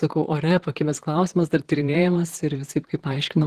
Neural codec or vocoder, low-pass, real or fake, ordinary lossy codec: vocoder, 44.1 kHz, 128 mel bands, Pupu-Vocoder; 14.4 kHz; fake; Opus, 16 kbps